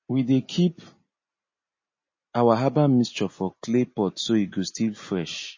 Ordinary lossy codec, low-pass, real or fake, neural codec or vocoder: MP3, 32 kbps; 7.2 kHz; real; none